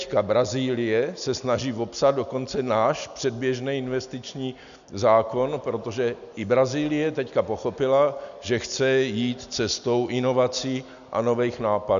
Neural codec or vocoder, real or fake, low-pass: none; real; 7.2 kHz